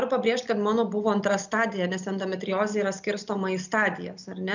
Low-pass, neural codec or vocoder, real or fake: 7.2 kHz; none; real